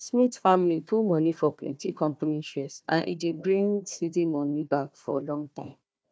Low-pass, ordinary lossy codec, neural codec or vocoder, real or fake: none; none; codec, 16 kHz, 1 kbps, FunCodec, trained on Chinese and English, 50 frames a second; fake